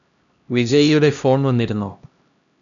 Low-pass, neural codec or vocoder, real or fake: 7.2 kHz; codec, 16 kHz, 1 kbps, X-Codec, HuBERT features, trained on LibriSpeech; fake